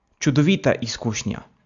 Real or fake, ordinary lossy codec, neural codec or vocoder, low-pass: real; AAC, 64 kbps; none; 7.2 kHz